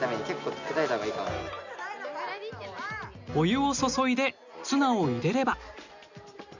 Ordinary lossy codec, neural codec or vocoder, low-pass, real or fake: none; none; 7.2 kHz; real